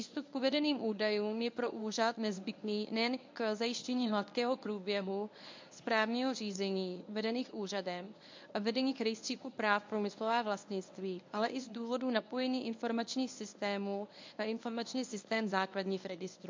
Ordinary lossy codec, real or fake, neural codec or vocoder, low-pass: MP3, 48 kbps; fake; codec, 24 kHz, 0.9 kbps, WavTokenizer, medium speech release version 1; 7.2 kHz